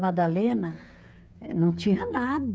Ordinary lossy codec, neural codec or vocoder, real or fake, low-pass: none; codec, 16 kHz, 8 kbps, FreqCodec, smaller model; fake; none